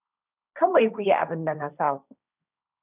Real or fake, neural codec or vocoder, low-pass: fake; codec, 16 kHz, 1.1 kbps, Voila-Tokenizer; 3.6 kHz